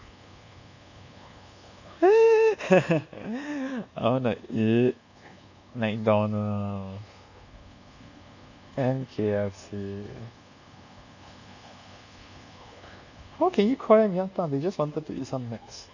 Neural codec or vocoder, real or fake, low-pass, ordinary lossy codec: codec, 24 kHz, 1.2 kbps, DualCodec; fake; 7.2 kHz; none